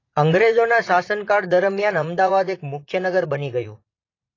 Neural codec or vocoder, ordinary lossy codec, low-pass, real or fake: vocoder, 44.1 kHz, 80 mel bands, Vocos; AAC, 32 kbps; 7.2 kHz; fake